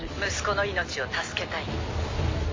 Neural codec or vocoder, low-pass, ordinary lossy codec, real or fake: none; 7.2 kHz; MP3, 32 kbps; real